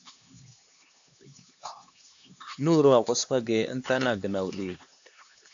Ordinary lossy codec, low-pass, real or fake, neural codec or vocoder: AAC, 64 kbps; 7.2 kHz; fake; codec, 16 kHz, 2 kbps, X-Codec, HuBERT features, trained on LibriSpeech